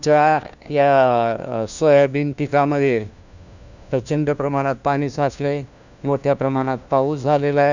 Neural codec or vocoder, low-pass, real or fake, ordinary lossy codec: codec, 16 kHz, 1 kbps, FunCodec, trained on LibriTTS, 50 frames a second; 7.2 kHz; fake; none